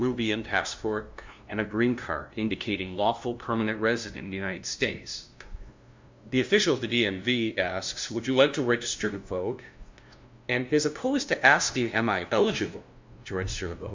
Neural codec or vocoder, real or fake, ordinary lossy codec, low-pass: codec, 16 kHz, 0.5 kbps, FunCodec, trained on LibriTTS, 25 frames a second; fake; MP3, 64 kbps; 7.2 kHz